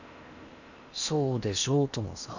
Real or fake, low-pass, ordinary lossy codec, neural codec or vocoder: fake; 7.2 kHz; none; codec, 16 kHz in and 24 kHz out, 0.8 kbps, FocalCodec, streaming, 65536 codes